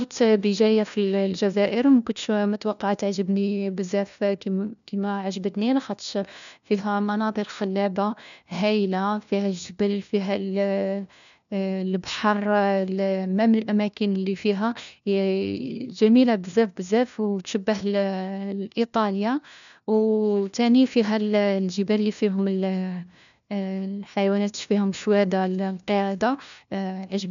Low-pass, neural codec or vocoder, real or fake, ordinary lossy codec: 7.2 kHz; codec, 16 kHz, 1 kbps, FunCodec, trained on LibriTTS, 50 frames a second; fake; none